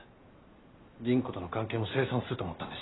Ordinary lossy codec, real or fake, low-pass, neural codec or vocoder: AAC, 16 kbps; real; 7.2 kHz; none